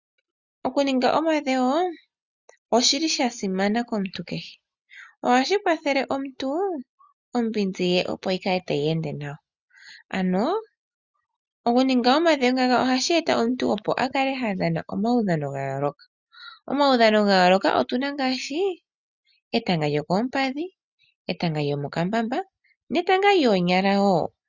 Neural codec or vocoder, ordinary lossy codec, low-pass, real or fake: none; Opus, 64 kbps; 7.2 kHz; real